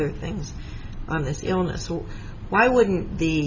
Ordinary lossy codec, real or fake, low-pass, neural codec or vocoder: Opus, 64 kbps; real; 7.2 kHz; none